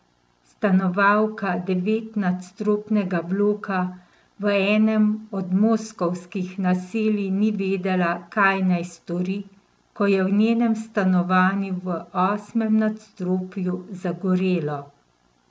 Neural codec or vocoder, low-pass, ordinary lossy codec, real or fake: none; none; none; real